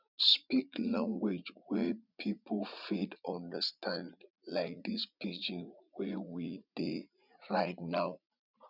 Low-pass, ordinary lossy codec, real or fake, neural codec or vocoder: 5.4 kHz; none; fake; vocoder, 44.1 kHz, 80 mel bands, Vocos